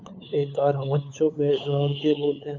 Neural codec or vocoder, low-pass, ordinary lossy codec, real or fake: codec, 16 kHz, 8 kbps, FunCodec, trained on LibriTTS, 25 frames a second; 7.2 kHz; MP3, 64 kbps; fake